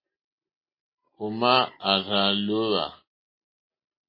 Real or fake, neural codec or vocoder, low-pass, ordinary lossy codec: real; none; 5.4 kHz; MP3, 24 kbps